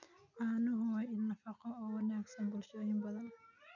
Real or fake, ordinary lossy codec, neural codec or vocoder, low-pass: real; none; none; 7.2 kHz